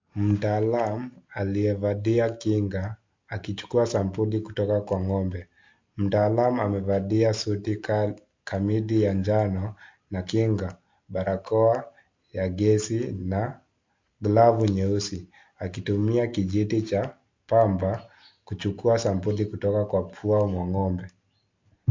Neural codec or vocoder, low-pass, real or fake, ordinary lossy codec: none; 7.2 kHz; real; MP3, 48 kbps